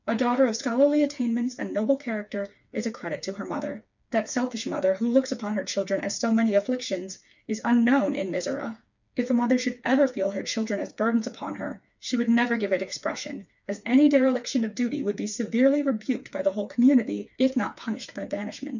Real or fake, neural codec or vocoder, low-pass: fake; codec, 16 kHz, 4 kbps, FreqCodec, smaller model; 7.2 kHz